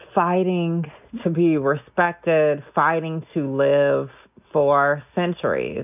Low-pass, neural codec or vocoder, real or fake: 3.6 kHz; none; real